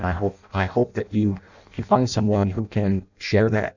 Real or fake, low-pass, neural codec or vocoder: fake; 7.2 kHz; codec, 16 kHz in and 24 kHz out, 0.6 kbps, FireRedTTS-2 codec